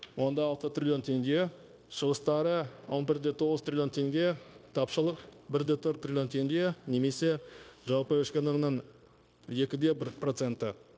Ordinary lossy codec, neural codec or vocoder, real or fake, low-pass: none; codec, 16 kHz, 0.9 kbps, LongCat-Audio-Codec; fake; none